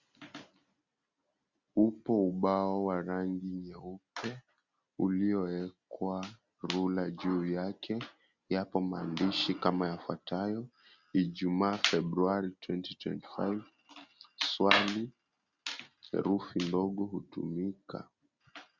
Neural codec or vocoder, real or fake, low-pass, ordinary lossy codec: none; real; 7.2 kHz; Opus, 64 kbps